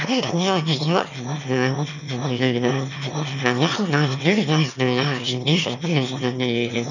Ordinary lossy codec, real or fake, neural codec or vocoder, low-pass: none; fake; autoencoder, 22.05 kHz, a latent of 192 numbers a frame, VITS, trained on one speaker; 7.2 kHz